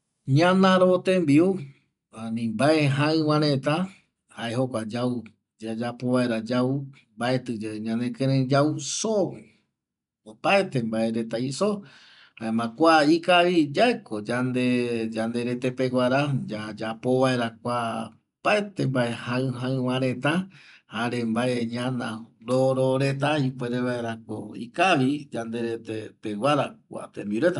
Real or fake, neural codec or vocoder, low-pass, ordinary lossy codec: real; none; 10.8 kHz; none